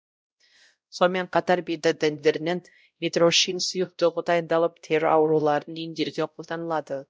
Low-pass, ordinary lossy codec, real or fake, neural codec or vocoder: none; none; fake; codec, 16 kHz, 0.5 kbps, X-Codec, WavLM features, trained on Multilingual LibriSpeech